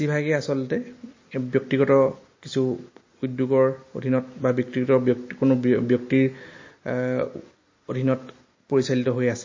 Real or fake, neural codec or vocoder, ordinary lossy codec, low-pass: real; none; MP3, 32 kbps; 7.2 kHz